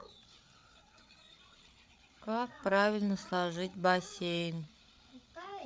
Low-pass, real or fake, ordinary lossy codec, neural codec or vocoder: none; fake; none; codec, 16 kHz, 8 kbps, FreqCodec, larger model